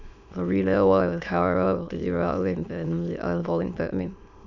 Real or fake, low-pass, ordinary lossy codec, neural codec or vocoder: fake; 7.2 kHz; none; autoencoder, 22.05 kHz, a latent of 192 numbers a frame, VITS, trained on many speakers